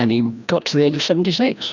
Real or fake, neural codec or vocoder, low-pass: fake; codec, 16 kHz, 1 kbps, FreqCodec, larger model; 7.2 kHz